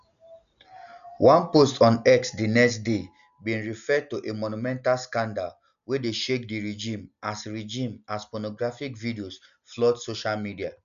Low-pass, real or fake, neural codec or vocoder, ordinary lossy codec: 7.2 kHz; real; none; none